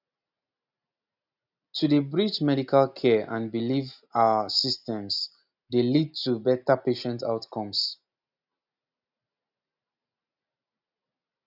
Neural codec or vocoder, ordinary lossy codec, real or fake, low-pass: none; none; real; 5.4 kHz